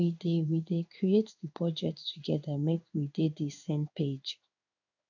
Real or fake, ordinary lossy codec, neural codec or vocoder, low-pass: fake; none; codec, 16 kHz in and 24 kHz out, 1 kbps, XY-Tokenizer; 7.2 kHz